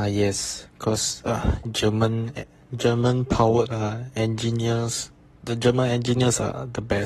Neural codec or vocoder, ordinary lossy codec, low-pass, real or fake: codec, 44.1 kHz, 7.8 kbps, Pupu-Codec; AAC, 32 kbps; 19.8 kHz; fake